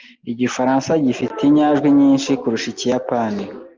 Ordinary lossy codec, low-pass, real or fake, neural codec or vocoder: Opus, 32 kbps; 7.2 kHz; real; none